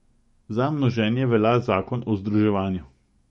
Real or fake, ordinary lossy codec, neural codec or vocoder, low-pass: fake; MP3, 48 kbps; codec, 44.1 kHz, 7.8 kbps, DAC; 19.8 kHz